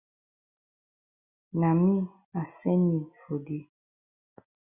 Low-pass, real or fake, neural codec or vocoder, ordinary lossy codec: 3.6 kHz; real; none; Opus, 64 kbps